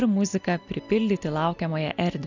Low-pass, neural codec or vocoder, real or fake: 7.2 kHz; none; real